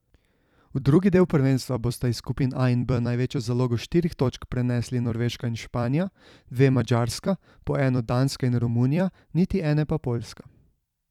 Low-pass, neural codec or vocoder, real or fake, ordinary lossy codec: 19.8 kHz; vocoder, 44.1 kHz, 128 mel bands every 256 samples, BigVGAN v2; fake; none